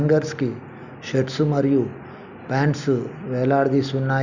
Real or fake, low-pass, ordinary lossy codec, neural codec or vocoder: real; 7.2 kHz; none; none